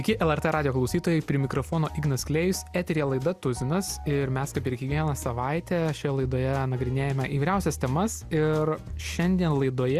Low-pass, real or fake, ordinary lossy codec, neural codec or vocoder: 14.4 kHz; real; Opus, 64 kbps; none